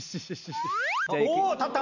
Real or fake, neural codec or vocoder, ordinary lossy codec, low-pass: real; none; none; 7.2 kHz